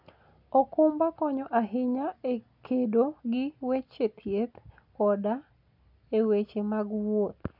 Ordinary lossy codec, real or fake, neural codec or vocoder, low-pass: none; real; none; 5.4 kHz